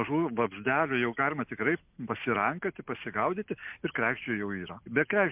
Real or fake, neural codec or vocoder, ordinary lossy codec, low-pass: real; none; MP3, 32 kbps; 3.6 kHz